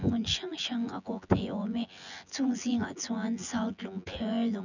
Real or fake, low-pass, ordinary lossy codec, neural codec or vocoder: fake; 7.2 kHz; none; vocoder, 24 kHz, 100 mel bands, Vocos